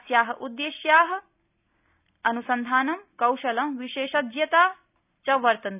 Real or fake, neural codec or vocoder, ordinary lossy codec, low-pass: real; none; none; 3.6 kHz